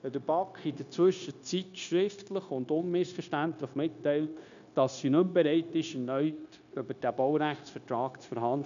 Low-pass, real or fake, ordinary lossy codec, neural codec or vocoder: 7.2 kHz; fake; none; codec, 16 kHz, 0.9 kbps, LongCat-Audio-Codec